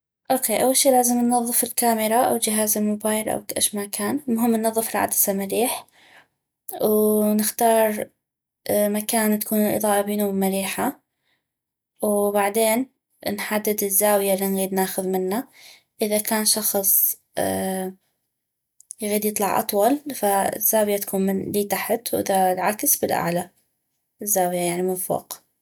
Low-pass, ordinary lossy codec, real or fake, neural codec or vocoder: none; none; real; none